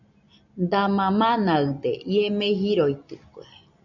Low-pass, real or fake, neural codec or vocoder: 7.2 kHz; real; none